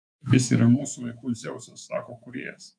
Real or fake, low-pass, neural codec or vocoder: fake; 9.9 kHz; codec, 24 kHz, 3.1 kbps, DualCodec